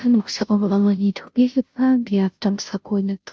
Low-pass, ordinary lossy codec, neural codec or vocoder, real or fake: none; none; codec, 16 kHz, 0.5 kbps, FunCodec, trained on Chinese and English, 25 frames a second; fake